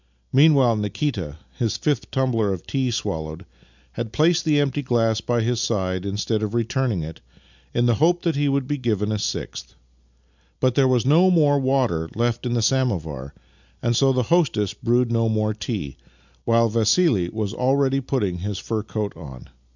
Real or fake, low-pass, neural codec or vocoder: real; 7.2 kHz; none